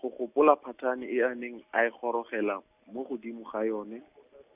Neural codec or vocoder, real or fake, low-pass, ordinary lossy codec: none; real; 3.6 kHz; none